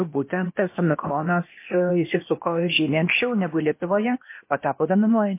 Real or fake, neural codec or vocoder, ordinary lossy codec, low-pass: fake; codec, 16 kHz, 0.8 kbps, ZipCodec; MP3, 24 kbps; 3.6 kHz